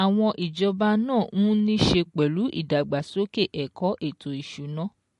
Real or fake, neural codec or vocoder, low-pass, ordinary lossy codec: real; none; 14.4 kHz; MP3, 48 kbps